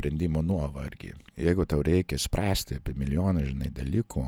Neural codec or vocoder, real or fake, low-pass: vocoder, 48 kHz, 128 mel bands, Vocos; fake; 19.8 kHz